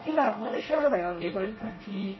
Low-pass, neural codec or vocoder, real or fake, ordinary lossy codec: 7.2 kHz; codec, 24 kHz, 1 kbps, SNAC; fake; MP3, 24 kbps